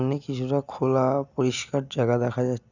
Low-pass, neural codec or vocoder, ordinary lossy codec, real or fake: 7.2 kHz; none; none; real